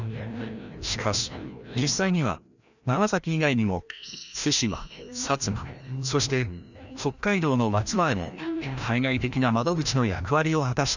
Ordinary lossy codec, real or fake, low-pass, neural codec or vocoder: none; fake; 7.2 kHz; codec, 16 kHz, 1 kbps, FreqCodec, larger model